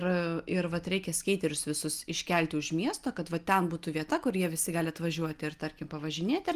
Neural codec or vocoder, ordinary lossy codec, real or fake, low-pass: none; Opus, 32 kbps; real; 14.4 kHz